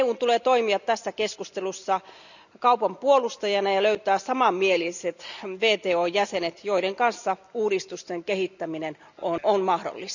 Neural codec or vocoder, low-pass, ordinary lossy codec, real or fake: none; 7.2 kHz; none; real